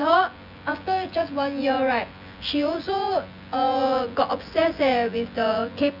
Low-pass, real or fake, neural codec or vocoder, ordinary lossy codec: 5.4 kHz; fake; vocoder, 24 kHz, 100 mel bands, Vocos; none